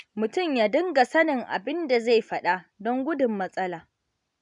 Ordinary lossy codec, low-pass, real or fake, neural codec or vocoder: none; 10.8 kHz; real; none